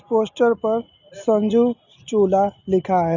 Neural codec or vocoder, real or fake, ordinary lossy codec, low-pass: none; real; none; 7.2 kHz